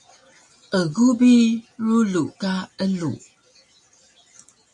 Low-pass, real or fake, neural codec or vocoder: 10.8 kHz; real; none